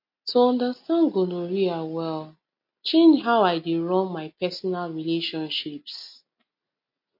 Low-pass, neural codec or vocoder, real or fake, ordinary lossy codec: 5.4 kHz; none; real; MP3, 32 kbps